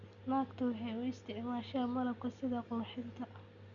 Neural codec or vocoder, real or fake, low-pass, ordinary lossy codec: none; real; 7.2 kHz; none